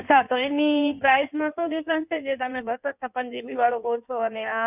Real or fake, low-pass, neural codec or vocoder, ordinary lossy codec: fake; 3.6 kHz; codec, 16 kHz in and 24 kHz out, 1.1 kbps, FireRedTTS-2 codec; none